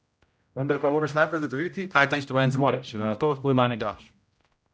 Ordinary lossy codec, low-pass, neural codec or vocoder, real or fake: none; none; codec, 16 kHz, 0.5 kbps, X-Codec, HuBERT features, trained on general audio; fake